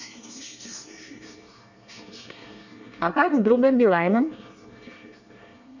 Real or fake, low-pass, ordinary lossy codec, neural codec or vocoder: fake; 7.2 kHz; none; codec, 24 kHz, 1 kbps, SNAC